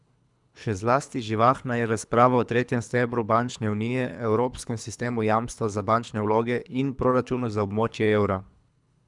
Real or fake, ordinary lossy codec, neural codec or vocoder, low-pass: fake; none; codec, 24 kHz, 3 kbps, HILCodec; 10.8 kHz